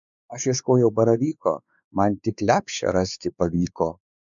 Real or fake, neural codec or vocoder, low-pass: fake; codec, 16 kHz, 4 kbps, X-Codec, WavLM features, trained on Multilingual LibriSpeech; 7.2 kHz